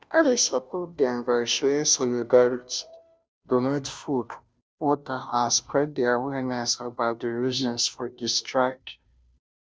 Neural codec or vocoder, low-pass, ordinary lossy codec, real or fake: codec, 16 kHz, 0.5 kbps, FunCodec, trained on Chinese and English, 25 frames a second; none; none; fake